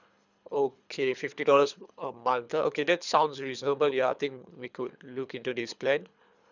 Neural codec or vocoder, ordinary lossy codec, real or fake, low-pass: codec, 24 kHz, 3 kbps, HILCodec; none; fake; 7.2 kHz